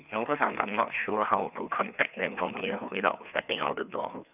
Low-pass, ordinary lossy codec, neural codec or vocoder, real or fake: 3.6 kHz; none; codec, 16 kHz, 2 kbps, FreqCodec, larger model; fake